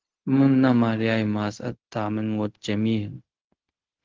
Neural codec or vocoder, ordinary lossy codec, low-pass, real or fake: codec, 16 kHz, 0.4 kbps, LongCat-Audio-Codec; Opus, 16 kbps; 7.2 kHz; fake